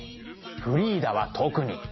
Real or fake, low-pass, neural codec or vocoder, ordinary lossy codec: real; 7.2 kHz; none; MP3, 24 kbps